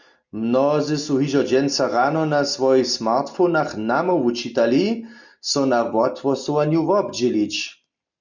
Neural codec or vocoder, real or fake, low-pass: none; real; 7.2 kHz